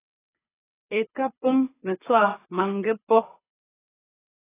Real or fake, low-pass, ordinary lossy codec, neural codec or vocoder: fake; 3.6 kHz; AAC, 16 kbps; codec, 24 kHz, 6 kbps, HILCodec